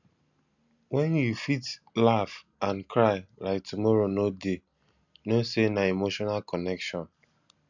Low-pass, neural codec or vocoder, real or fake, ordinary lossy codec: 7.2 kHz; none; real; none